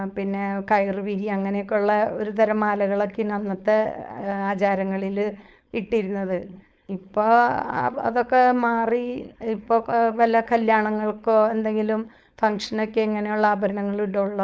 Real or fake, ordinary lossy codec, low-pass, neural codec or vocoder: fake; none; none; codec, 16 kHz, 4.8 kbps, FACodec